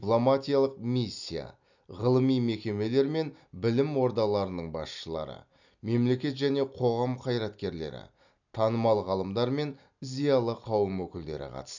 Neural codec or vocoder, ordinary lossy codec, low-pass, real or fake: none; none; 7.2 kHz; real